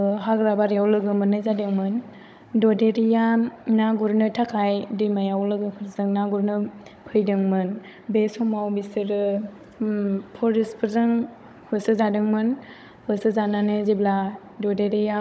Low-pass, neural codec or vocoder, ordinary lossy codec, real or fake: none; codec, 16 kHz, 16 kbps, FunCodec, trained on Chinese and English, 50 frames a second; none; fake